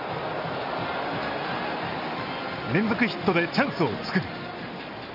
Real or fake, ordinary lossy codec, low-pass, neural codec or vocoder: real; none; 5.4 kHz; none